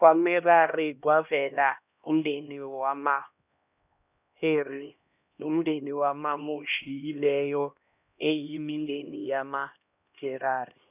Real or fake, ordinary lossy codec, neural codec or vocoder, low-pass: fake; none; codec, 16 kHz, 1 kbps, X-Codec, HuBERT features, trained on LibriSpeech; 3.6 kHz